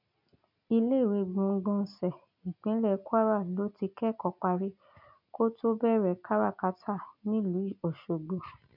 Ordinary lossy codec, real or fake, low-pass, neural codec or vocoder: none; real; 5.4 kHz; none